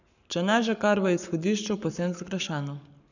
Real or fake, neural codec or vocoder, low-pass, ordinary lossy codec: fake; codec, 44.1 kHz, 7.8 kbps, Pupu-Codec; 7.2 kHz; none